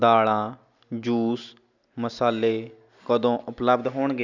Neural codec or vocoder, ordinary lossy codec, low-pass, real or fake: none; none; 7.2 kHz; real